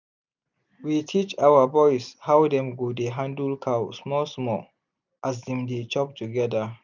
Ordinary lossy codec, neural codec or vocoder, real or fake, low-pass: none; none; real; 7.2 kHz